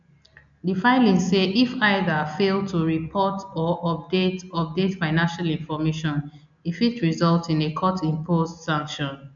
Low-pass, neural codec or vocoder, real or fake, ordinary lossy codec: 7.2 kHz; none; real; none